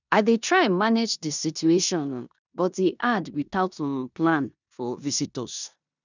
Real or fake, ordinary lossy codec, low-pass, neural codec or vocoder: fake; none; 7.2 kHz; codec, 16 kHz in and 24 kHz out, 0.9 kbps, LongCat-Audio-Codec, four codebook decoder